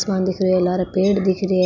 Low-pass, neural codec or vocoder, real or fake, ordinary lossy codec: 7.2 kHz; none; real; none